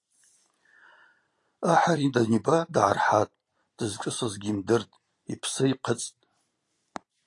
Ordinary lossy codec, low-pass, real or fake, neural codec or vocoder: AAC, 48 kbps; 10.8 kHz; fake; vocoder, 44.1 kHz, 128 mel bands every 512 samples, BigVGAN v2